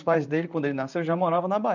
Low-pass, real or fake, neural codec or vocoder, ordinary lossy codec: 7.2 kHz; fake; vocoder, 44.1 kHz, 128 mel bands, Pupu-Vocoder; none